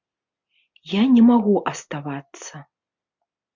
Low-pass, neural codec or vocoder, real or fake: 7.2 kHz; none; real